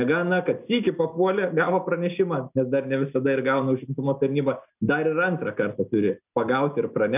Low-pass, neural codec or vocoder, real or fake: 3.6 kHz; none; real